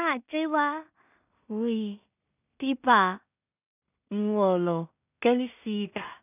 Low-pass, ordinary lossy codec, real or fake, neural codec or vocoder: 3.6 kHz; none; fake; codec, 16 kHz in and 24 kHz out, 0.4 kbps, LongCat-Audio-Codec, two codebook decoder